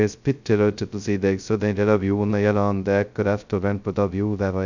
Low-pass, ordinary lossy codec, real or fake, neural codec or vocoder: 7.2 kHz; none; fake; codec, 16 kHz, 0.2 kbps, FocalCodec